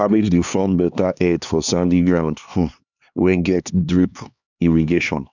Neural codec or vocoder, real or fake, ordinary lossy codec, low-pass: codec, 16 kHz, 2 kbps, X-Codec, HuBERT features, trained on LibriSpeech; fake; none; 7.2 kHz